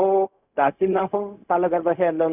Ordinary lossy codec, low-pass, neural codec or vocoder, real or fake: none; 3.6 kHz; codec, 16 kHz, 0.4 kbps, LongCat-Audio-Codec; fake